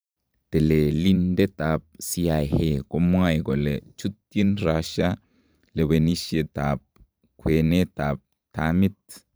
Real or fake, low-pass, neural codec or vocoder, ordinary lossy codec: fake; none; vocoder, 44.1 kHz, 128 mel bands every 512 samples, BigVGAN v2; none